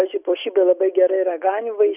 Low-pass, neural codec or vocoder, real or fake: 3.6 kHz; none; real